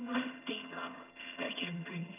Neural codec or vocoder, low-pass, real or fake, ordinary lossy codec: vocoder, 22.05 kHz, 80 mel bands, HiFi-GAN; 3.6 kHz; fake; none